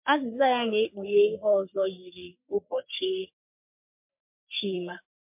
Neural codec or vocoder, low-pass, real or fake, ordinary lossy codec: codec, 44.1 kHz, 3.4 kbps, Pupu-Codec; 3.6 kHz; fake; MP3, 32 kbps